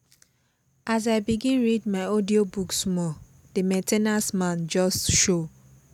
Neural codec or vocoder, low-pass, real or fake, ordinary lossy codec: none; 19.8 kHz; real; none